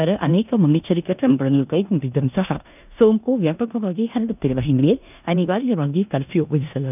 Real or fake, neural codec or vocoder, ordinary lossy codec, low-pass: fake; codec, 16 kHz in and 24 kHz out, 0.9 kbps, LongCat-Audio-Codec, four codebook decoder; none; 3.6 kHz